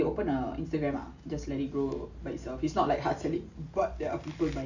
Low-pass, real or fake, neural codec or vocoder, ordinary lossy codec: 7.2 kHz; real; none; none